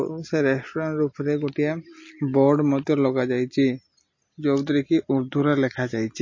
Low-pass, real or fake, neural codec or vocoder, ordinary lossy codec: 7.2 kHz; real; none; MP3, 32 kbps